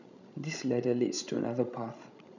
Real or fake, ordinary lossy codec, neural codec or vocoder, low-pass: fake; none; codec, 16 kHz, 16 kbps, FreqCodec, larger model; 7.2 kHz